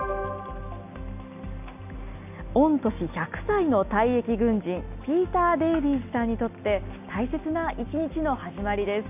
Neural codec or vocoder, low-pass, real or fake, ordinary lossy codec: none; 3.6 kHz; real; none